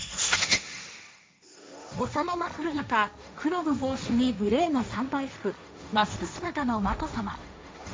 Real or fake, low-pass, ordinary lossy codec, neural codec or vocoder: fake; none; none; codec, 16 kHz, 1.1 kbps, Voila-Tokenizer